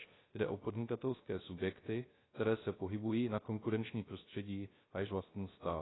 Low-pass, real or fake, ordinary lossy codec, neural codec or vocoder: 7.2 kHz; fake; AAC, 16 kbps; codec, 16 kHz, 0.3 kbps, FocalCodec